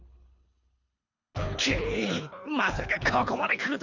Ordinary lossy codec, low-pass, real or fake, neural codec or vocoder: none; 7.2 kHz; fake; codec, 24 kHz, 3 kbps, HILCodec